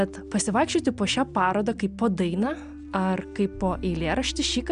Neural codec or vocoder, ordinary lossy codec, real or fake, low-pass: none; AAC, 96 kbps; real; 9.9 kHz